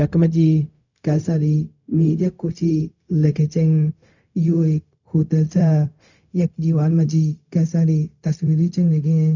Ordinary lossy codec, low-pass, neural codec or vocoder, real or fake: none; 7.2 kHz; codec, 16 kHz, 0.4 kbps, LongCat-Audio-Codec; fake